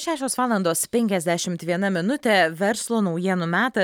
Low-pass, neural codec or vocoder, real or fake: 19.8 kHz; none; real